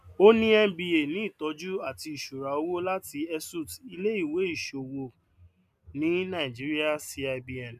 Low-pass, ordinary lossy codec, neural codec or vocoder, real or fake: 14.4 kHz; none; none; real